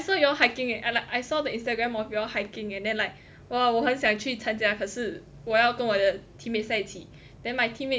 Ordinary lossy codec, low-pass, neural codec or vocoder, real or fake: none; none; none; real